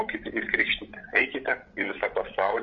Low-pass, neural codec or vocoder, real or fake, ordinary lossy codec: 7.2 kHz; codec, 16 kHz, 8 kbps, FreqCodec, larger model; fake; MP3, 48 kbps